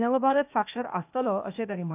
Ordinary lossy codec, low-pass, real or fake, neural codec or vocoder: none; 3.6 kHz; fake; codec, 16 kHz, 0.8 kbps, ZipCodec